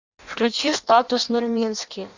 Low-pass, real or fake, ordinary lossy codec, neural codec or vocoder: 7.2 kHz; fake; Opus, 64 kbps; codec, 16 kHz in and 24 kHz out, 0.6 kbps, FireRedTTS-2 codec